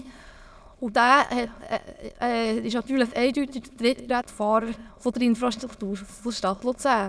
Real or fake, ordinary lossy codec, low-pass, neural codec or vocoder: fake; none; none; autoencoder, 22.05 kHz, a latent of 192 numbers a frame, VITS, trained on many speakers